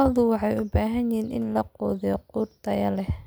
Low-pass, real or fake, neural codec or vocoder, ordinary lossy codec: none; real; none; none